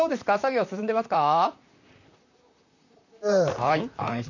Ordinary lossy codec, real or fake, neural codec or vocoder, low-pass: none; fake; vocoder, 44.1 kHz, 128 mel bands, Pupu-Vocoder; 7.2 kHz